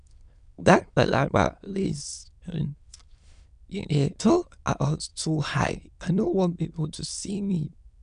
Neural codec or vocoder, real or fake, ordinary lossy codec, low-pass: autoencoder, 22.05 kHz, a latent of 192 numbers a frame, VITS, trained on many speakers; fake; none; 9.9 kHz